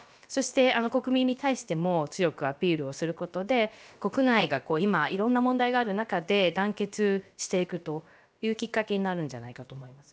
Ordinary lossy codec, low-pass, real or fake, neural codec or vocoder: none; none; fake; codec, 16 kHz, about 1 kbps, DyCAST, with the encoder's durations